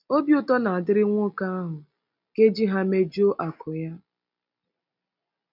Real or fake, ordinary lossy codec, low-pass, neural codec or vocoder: real; none; 5.4 kHz; none